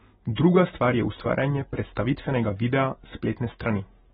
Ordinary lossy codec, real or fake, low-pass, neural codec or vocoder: AAC, 16 kbps; real; 19.8 kHz; none